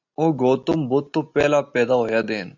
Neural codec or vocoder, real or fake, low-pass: none; real; 7.2 kHz